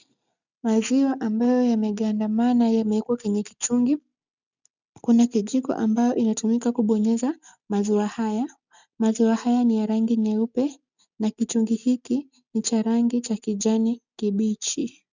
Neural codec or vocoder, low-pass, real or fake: none; 7.2 kHz; real